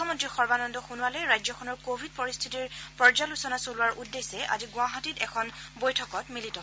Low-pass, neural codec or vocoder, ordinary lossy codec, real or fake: none; none; none; real